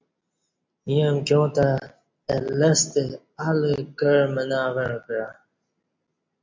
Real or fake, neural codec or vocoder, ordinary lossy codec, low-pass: real; none; MP3, 64 kbps; 7.2 kHz